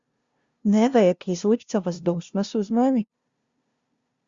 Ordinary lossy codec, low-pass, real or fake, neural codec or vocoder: Opus, 64 kbps; 7.2 kHz; fake; codec, 16 kHz, 0.5 kbps, FunCodec, trained on LibriTTS, 25 frames a second